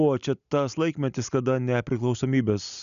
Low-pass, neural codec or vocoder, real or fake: 7.2 kHz; none; real